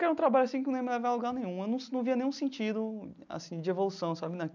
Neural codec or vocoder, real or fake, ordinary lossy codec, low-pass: none; real; none; 7.2 kHz